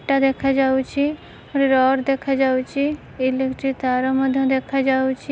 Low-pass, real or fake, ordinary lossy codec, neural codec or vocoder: none; real; none; none